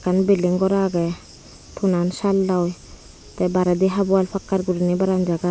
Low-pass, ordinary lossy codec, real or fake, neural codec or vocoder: none; none; real; none